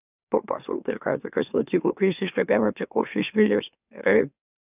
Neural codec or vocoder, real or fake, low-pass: autoencoder, 44.1 kHz, a latent of 192 numbers a frame, MeloTTS; fake; 3.6 kHz